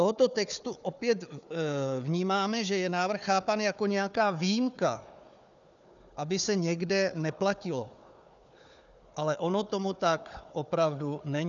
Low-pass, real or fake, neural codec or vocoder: 7.2 kHz; fake; codec, 16 kHz, 4 kbps, FunCodec, trained on Chinese and English, 50 frames a second